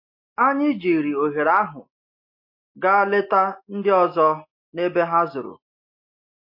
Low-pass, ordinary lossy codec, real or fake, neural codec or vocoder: 5.4 kHz; MP3, 32 kbps; real; none